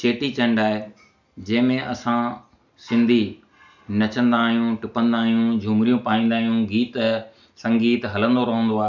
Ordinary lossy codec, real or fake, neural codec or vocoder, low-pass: none; real; none; 7.2 kHz